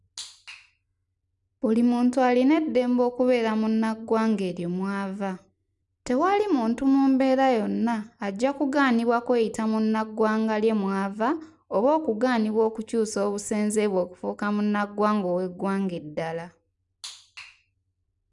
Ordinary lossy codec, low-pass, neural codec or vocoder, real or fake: none; 10.8 kHz; none; real